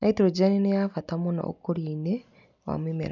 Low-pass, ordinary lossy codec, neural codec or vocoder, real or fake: 7.2 kHz; none; none; real